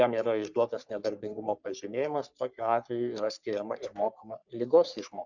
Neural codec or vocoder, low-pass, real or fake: codec, 44.1 kHz, 3.4 kbps, Pupu-Codec; 7.2 kHz; fake